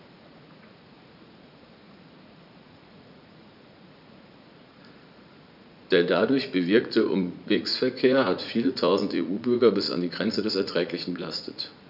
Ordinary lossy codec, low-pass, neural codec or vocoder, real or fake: none; 5.4 kHz; vocoder, 44.1 kHz, 80 mel bands, Vocos; fake